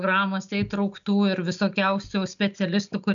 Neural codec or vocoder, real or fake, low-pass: none; real; 7.2 kHz